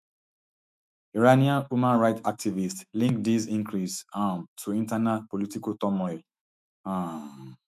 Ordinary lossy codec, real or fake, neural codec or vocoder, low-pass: none; fake; autoencoder, 48 kHz, 128 numbers a frame, DAC-VAE, trained on Japanese speech; 14.4 kHz